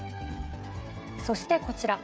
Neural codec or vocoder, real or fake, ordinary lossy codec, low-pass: codec, 16 kHz, 16 kbps, FreqCodec, smaller model; fake; none; none